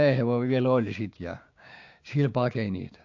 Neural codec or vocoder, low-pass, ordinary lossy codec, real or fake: codec, 16 kHz, 6 kbps, DAC; 7.2 kHz; AAC, 48 kbps; fake